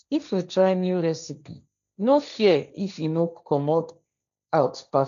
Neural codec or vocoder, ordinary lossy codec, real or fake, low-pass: codec, 16 kHz, 1.1 kbps, Voila-Tokenizer; none; fake; 7.2 kHz